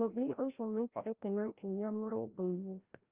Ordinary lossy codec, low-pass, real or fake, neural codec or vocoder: Opus, 24 kbps; 3.6 kHz; fake; codec, 16 kHz, 0.5 kbps, FreqCodec, larger model